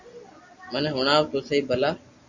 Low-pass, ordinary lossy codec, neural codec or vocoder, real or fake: 7.2 kHz; Opus, 64 kbps; none; real